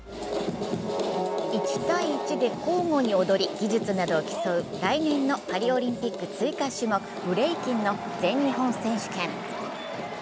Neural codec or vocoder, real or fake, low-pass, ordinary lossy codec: none; real; none; none